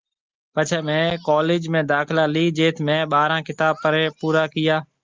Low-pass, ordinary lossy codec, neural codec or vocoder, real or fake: 7.2 kHz; Opus, 32 kbps; none; real